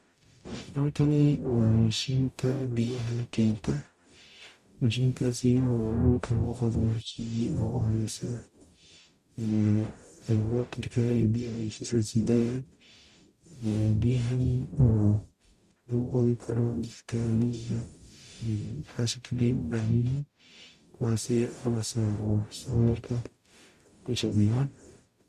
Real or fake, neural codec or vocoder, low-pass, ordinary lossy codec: fake; codec, 44.1 kHz, 0.9 kbps, DAC; 14.4 kHz; Opus, 64 kbps